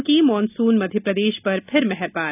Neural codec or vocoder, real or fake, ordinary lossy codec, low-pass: none; real; none; 3.6 kHz